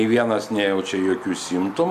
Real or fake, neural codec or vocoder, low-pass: real; none; 14.4 kHz